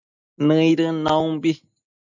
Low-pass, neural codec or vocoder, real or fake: 7.2 kHz; none; real